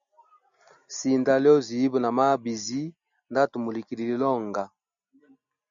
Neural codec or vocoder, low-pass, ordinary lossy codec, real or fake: none; 7.2 kHz; MP3, 96 kbps; real